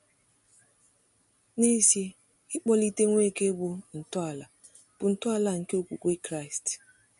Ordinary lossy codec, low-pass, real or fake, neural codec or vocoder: MP3, 48 kbps; 14.4 kHz; real; none